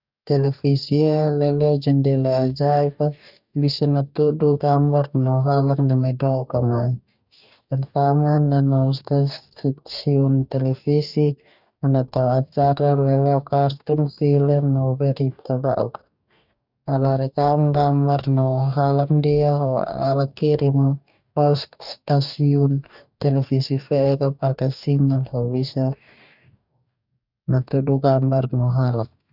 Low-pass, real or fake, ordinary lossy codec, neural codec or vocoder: 5.4 kHz; fake; none; codec, 44.1 kHz, 2.6 kbps, DAC